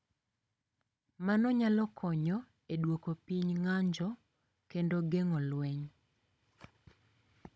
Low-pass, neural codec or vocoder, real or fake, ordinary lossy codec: none; codec, 16 kHz, 16 kbps, FunCodec, trained on Chinese and English, 50 frames a second; fake; none